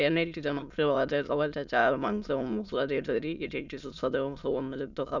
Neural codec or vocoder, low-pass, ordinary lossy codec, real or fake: autoencoder, 22.05 kHz, a latent of 192 numbers a frame, VITS, trained on many speakers; 7.2 kHz; none; fake